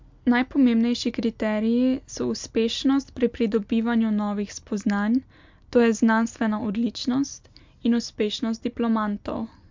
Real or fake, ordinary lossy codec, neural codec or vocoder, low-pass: real; MP3, 64 kbps; none; 7.2 kHz